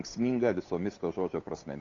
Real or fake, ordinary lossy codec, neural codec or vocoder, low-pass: fake; Opus, 64 kbps; codec, 16 kHz, 8 kbps, FunCodec, trained on Chinese and English, 25 frames a second; 7.2 kHz